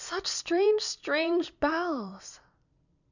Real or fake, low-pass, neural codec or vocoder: fake; 7.2 kHz; vocoder, 44.1 kHz, 128 mel bands every 256 samples, BigVGAN v2